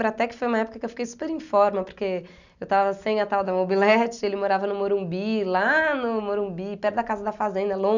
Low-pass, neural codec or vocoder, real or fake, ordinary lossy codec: 7.2 kHz; none; real; none